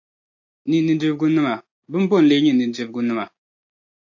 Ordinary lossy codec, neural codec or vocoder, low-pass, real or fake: AAC, 48 kbps; none; 7.2 kHz; real